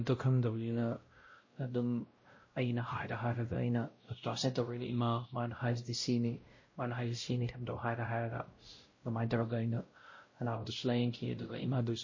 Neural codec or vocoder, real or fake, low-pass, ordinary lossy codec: codec, 16 kHz, 0.5 kbps, X-Codec, WavLM features, trained on Multilingual LibriSpeech; fake; 7.2 kHz; MP3, 32 kbps